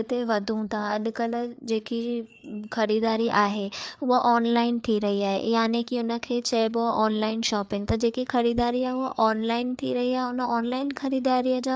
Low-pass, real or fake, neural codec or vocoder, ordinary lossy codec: none; fake; codec, 16 kHz, 4 kbps, FreqCodec, larger model; none